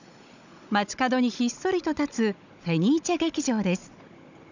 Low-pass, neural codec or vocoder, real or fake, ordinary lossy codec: 7.2 kHz; codec, 16 kHz, 16 kbps, FunCodec, trained on Chinese and English, 50 frames a second; fake; none